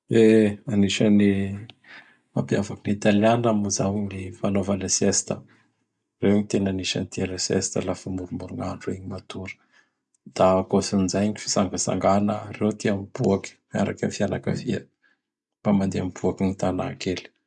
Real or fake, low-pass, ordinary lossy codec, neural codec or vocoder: real; 10.8 kHz; none; none